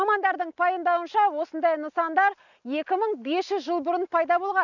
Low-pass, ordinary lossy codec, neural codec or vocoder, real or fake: 7.2 kHz; none; none; real